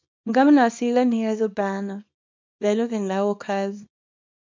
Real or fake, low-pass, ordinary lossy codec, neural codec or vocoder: fake; 7.2 kHz; MP3, 48 kbps; codec, 24 kHz, 0.9 kbps, WavTokenizer, small release